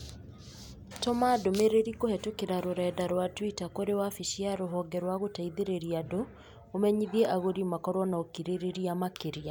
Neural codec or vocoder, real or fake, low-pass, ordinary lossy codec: none; real; none; none